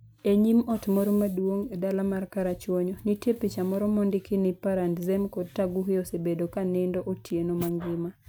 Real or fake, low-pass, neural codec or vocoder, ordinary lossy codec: real; none; none; none